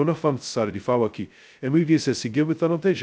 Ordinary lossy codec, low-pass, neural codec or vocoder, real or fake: none; none; codec, 16 kHz, 0.2 kbps, FocalCodec; fake